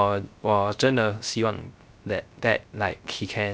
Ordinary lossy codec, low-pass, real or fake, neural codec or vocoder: none; none; fake; codec, 16 kHz, 0.3 kbps, FocalCodec